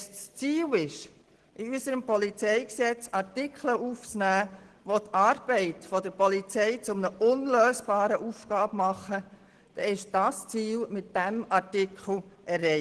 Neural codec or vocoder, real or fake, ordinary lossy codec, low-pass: none; real; Opus, 16 kbps; 10.8 kHz